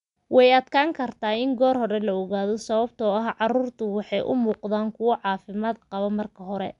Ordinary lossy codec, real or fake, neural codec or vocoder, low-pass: none; real; none; 10.8 kHz